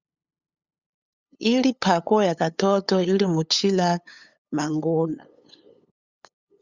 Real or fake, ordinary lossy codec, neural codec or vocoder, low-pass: fake; Opus, 64 kbps; codec, 16 kHz, 8 kbps, FunCodec, trained on LibriTTS, 25 frames a second; 7.2 kHz